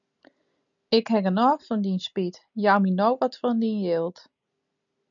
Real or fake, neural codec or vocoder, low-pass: real; none; 7.2 kHz